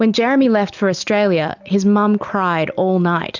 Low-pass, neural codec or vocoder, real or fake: 7.2 kHz; none; real